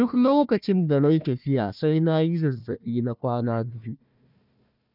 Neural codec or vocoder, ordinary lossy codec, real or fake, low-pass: codec, 16 kHz, 1 kbps, FunCodec, trained on Chinese and English, 50 frames a second; none; fake; 5.4 kHz